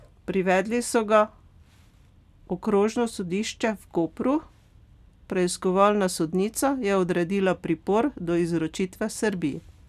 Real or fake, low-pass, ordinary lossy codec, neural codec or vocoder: real; 14.4 kHz; none; none